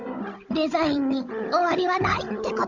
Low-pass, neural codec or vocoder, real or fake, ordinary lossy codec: 7.2 kHz; codec, 16 kHz, 16 kbps, FunCodec, trained on Chinese and English, 50 frames a second; fake; none